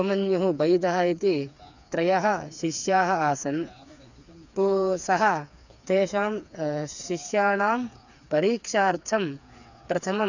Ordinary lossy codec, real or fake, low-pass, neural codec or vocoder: none; fake; 7.2 kHz; codec, 16 kHz, 4 kbps, FreqCodec, smaller model